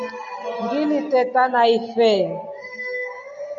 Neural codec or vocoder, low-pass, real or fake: none; 7.2 kHz; real